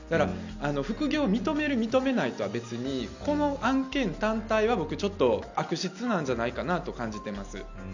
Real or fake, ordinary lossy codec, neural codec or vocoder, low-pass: real; none; none; 7.2 kHz